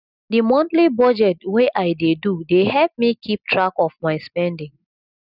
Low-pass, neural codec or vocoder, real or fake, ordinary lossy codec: 5.4 kHz; none; real; none